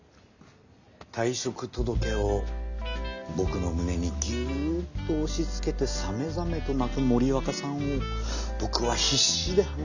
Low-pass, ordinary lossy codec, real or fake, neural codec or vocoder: 7.2 kHz; none; real; none